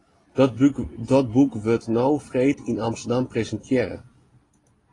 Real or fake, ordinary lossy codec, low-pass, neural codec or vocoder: real; AAC, 32 kbps; 10.8 kHz; none